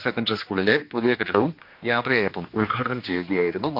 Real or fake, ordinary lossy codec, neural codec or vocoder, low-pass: fake; MP3, 48 kbps; codec, 16 kHz, 2 kbps, X-Codec, HuBERT features, trained on general audio; 5.4 kHz